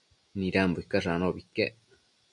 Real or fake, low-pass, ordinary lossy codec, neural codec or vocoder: real; 10.8 kHz; MP3, 96 kbps; none